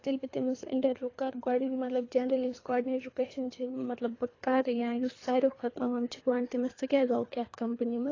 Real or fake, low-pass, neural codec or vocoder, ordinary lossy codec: fake; 7.2 kHz; codec, 24 kHz, 3 kbps, HILCodec; AAC, 32 kbps